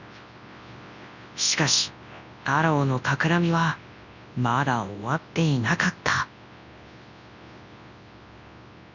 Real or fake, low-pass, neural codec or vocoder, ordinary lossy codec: fake; 7.2 kHz; codec, 24 kHz, 0.9 kbps, WavTokenizer, large speech release; none